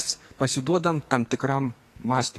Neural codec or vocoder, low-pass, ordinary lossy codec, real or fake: codec, 44.1 kHz, 2.6 kbps, SNAC; 14.4 kHz; AAC, 48 kbps; fake